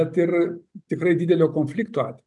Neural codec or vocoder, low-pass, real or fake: none; 10.8 kHz; real